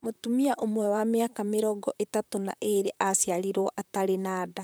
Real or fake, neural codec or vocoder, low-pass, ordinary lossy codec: fake; vocoder, 44.1 kHz, 128 mel bands, Pupu-Vocoder; none; none